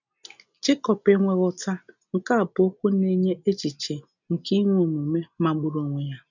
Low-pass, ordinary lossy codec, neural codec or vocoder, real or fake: 7.2 kHz; none; none; real